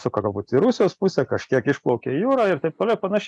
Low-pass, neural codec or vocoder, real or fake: 10.8 kHz; none; real